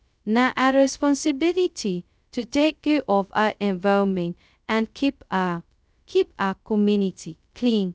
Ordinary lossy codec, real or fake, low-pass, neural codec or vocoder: none; fake; none; codec, 16 kHz, 0.2 kbps, FocalCodec